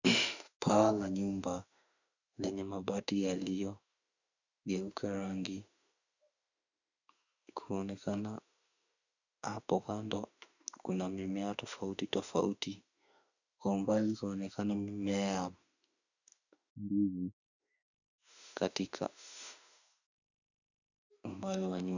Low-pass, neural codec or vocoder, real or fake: 7.2 kHz; autoencoder, 48 kHz, 32 numbers a frame, DAC-VAE, trained on Japanese speech; fake